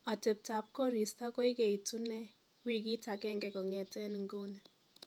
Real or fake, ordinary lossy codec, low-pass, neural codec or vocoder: fake; none; 19.8 kHz; vocoder, 48 kHz, 128 mel bands, Vocos